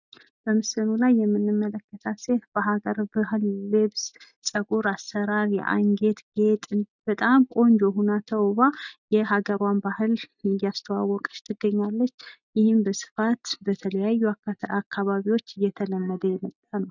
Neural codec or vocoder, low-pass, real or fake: none; 7.2 kHz; real